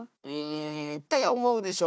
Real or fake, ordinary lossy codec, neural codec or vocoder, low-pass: fake; none; codec, 16 kHz, 1 kbps, FunCodec, trained on Chinese and English, 50 frames a second; none